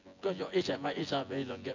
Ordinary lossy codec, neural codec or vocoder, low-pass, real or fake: AAC, 48 kbps; vocoder, 24 kHz, 100 mel bands, Vocos; 7.2 kHz; fake